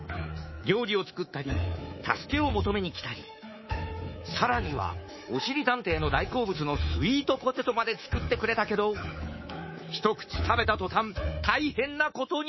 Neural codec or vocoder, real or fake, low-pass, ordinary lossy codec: codec, 24 kHz, 3.1 kbps, DualCodec; fake; 7.2 kHz; MP3, 24 kbps